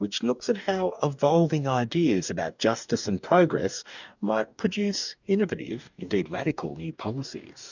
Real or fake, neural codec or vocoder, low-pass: fake; codec, 44.1 kHz, 2.6 kbps, DAC; 7.2 kHz